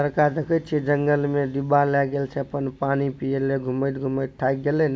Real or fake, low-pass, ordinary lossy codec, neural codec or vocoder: real; none; none; none